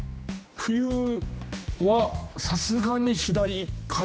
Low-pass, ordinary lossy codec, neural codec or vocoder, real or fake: none; none; codec, 16 kHz, 2 kbps, X-Codec, HuBERT features, trained on general audio; fake